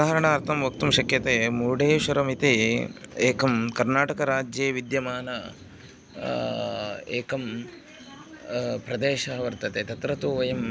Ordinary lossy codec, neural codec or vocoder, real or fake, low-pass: none; none; real; none